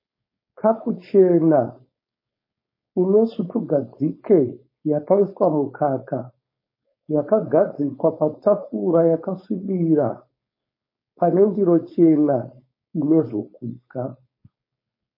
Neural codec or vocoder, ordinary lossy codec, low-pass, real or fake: codec, 16 kHz, 4.8 kbps, FACodec; MP3, 24 kbps; 5.4 kHz; fake